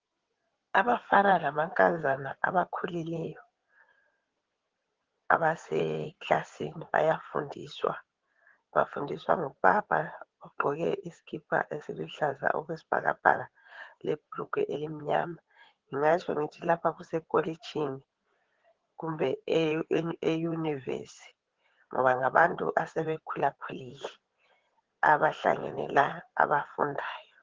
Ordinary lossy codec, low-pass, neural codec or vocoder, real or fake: Opus, 16 kbps; 7.2 kHz; codec, 16 kHz in and 24 kHz out, 2.2 kbps, FireRedTTS-2 codec; fake